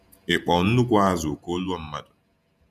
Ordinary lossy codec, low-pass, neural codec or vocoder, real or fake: none; 14.4 kHz; vocoder, 48 kHz, 128 mel bands, Vocos; fake